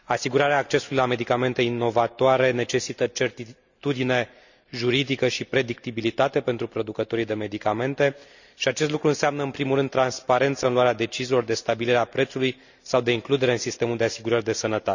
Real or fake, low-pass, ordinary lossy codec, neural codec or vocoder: real; 7.2 kHz; none; none